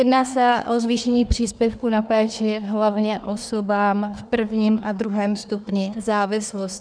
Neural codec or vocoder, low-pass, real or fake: codec, 24 kHz, 1 kbps, SNAC; 9.9 kHz; fake